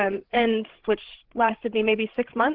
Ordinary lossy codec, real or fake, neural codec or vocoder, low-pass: Opus, 16 kbps; fake; codec, 16 kHz, 16 kbps, FreqCodec, larger model; 5.4 kHz